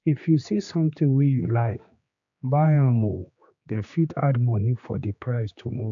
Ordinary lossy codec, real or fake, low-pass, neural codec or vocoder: AAC, 64 kbps; fake; 7.2 kHz; codec, 16 kHz, 2 kbps, X-Codec, HuBERT features, trained on general audio